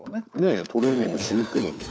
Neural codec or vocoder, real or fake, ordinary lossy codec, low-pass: codec, 16 kHz, 4 kbps, FunCodec, trained on LibriTTS, 50 frames a second; fake; none; none